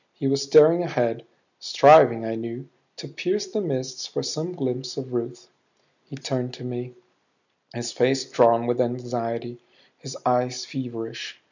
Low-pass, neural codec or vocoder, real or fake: 7.2 kHz; none; real